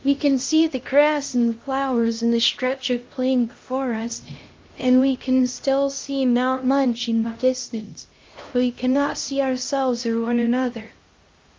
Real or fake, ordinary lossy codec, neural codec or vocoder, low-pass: fake; Opus, 32 kbps; codec, 16 kHz, 1 kbps, X-Codec, HuBERT features, trained on LibriSpeech; 7.2 kHz